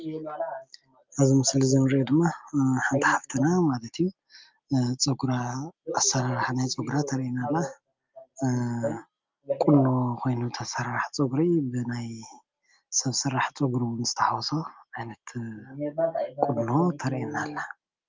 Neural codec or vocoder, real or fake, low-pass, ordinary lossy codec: none; real; 7.2 kHz; Opus, 24 kbps